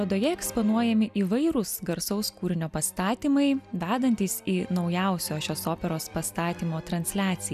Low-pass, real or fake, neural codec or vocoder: 14.4 kHz; real; none